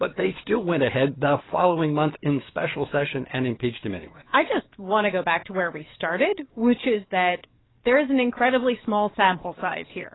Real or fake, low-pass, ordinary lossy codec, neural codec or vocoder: fake; 7.2 kHz; AAC, 16 kbps; codec, 44.1 kHz, 7.8 kbps, DAC